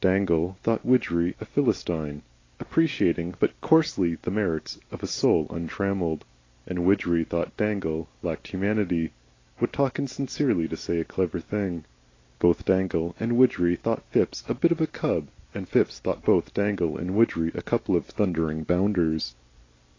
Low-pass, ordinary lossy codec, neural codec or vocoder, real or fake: 7.2 kHz; AAC, 32 kbps; none; real